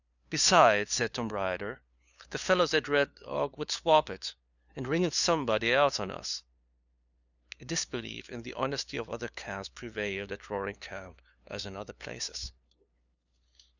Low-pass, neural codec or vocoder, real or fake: 7.2 kHz; codec, 16 kHz, 2 kbps, FunCodec, trained on LibriTTS, 25 frames a second; fake